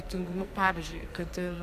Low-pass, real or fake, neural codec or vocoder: 14.4 kHz; fake; codec, 44.1 kHz, 2.6 kbps, SNAC